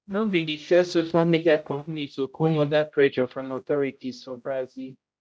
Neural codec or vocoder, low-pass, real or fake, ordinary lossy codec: codec, 16 kHz, 0.5 kbps, X-Codec, HuBERT features, trained on general audio; none; fake; none